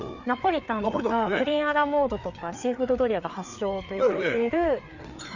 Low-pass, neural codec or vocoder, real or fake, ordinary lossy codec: 7.2 kHz; codec, 16 kHz, 4 kbps, FreqCodec, larger model; fake; none